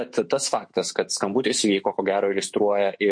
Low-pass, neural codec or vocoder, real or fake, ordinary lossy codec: 9.9 kHz; autoencoder, 48 kHz, 128 numbers a frame, DAC-VAE, trained on Japanese speech; fake; MP3, 48 kbps